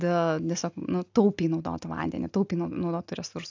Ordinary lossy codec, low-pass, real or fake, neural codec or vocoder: AAC, 48 kbps; 7.2 kHz; real; none